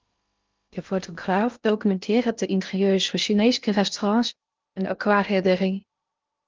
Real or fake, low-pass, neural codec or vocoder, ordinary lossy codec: fake; 7.2 kHz; codec, 16 kHz in and 24 kHz out, 0.6 kbps, FocalCodec, streaming, 2048 codes; Opus, 32 kbps